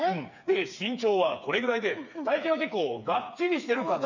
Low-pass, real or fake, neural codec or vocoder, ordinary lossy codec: 7.2 kHz; fake; codec, 16 kHz, 4 kbps, FreqCodec, smaller model; none